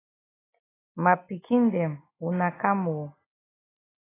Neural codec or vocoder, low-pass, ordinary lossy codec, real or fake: none; 3.6 kHz; AAC, 16 kbps; real